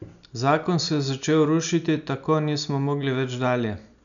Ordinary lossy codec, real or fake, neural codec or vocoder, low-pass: none; real; none; 7.2 kHz